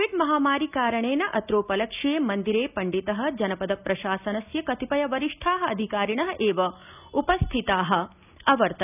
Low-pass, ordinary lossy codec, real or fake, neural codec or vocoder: 3.6 kHz; none; real; none